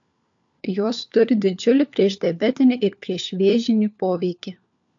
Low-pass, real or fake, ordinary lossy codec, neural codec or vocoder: 7.2 kHz; fake; AAC, 64 kbps; codec, 16 kHz, 4 kbps, FunCodec, trained on LibriTTS, 50 frames a second